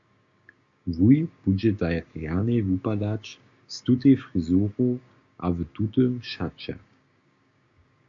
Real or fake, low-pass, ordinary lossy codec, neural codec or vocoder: fake; 7.2 kHz; MP3, 48 kbps; codec, 16 kHz, 6 kbps, DAC